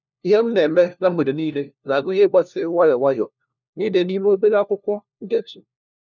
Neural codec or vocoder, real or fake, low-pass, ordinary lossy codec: codec, 16 kHz, 1 kbps, FunCodec, trained on LibriTTS, 50 frames a second; fake; 7.2 kHz; none